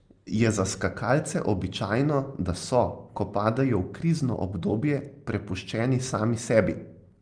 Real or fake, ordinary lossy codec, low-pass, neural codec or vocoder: real; Opus, 24 kbps; 9.9 kHz; none